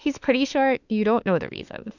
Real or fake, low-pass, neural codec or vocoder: fake; 7.2 kHz; autoencoder, 48 kHz, 32 numbers a frame, DAC-VAE, trained on Japanese speech